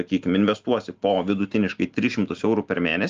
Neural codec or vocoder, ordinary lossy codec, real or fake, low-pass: none; Opus, 24 kbps; real; 7.2 kHz